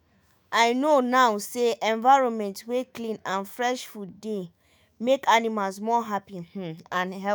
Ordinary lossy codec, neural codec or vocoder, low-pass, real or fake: none; autoencoder, 48 kHz, 128 numbers a frame, DAC-VAE, trained on Japanese speech; none; fake